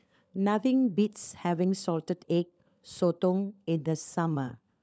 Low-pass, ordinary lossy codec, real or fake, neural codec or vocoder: none; none; fake; codec, 16 kHz, 16 kbps, FunCodec, trained on LibriTTS, 50 frames a second